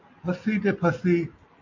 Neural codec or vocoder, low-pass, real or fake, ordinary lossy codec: none; 7.2 kHz; real; AAC, 48 kbps